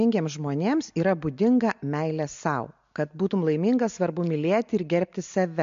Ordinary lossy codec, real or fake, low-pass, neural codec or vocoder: MP3, 48 kbps; real; 7.2 kHz; none